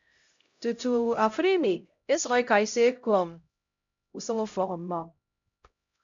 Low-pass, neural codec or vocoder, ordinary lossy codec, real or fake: 7.2 kHz; codec, 16 kHz, 0.5 kbps, X-Codec, HuBERT features, trained on LibriSpeech; MP3, 64 kbps; fake